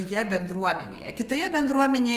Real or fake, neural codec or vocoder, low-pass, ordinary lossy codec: fake; codec, 32 kHz, 1.9 kbps, SNAC; 14.4 kHz; Opus, 32 kbps